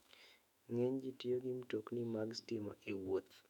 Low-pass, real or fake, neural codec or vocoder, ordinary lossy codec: 19.8 kHz; fake; autoencoder, 48 kHz, 128 numbers a frame, DAC-VAE, trained on Japanese speech; none